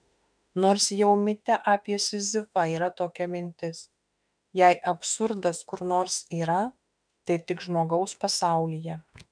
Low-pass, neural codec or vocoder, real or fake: 9.9 kHz; autoencoder, 48 kHz, 32 numbers a frame, DAC-VAE, trained on Japanese speech; fake